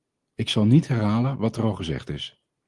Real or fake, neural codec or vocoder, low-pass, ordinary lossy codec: real; none; 10.8 kHz; Opus, 24 kbps